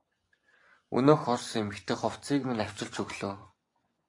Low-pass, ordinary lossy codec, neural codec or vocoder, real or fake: 10.8 kHz; AAC, 48 kbps; vocoder, 24 kHz, 100 mel bands, Vocos; fake